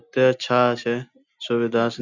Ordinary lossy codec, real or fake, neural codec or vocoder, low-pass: none; real; none; 7.2 kHz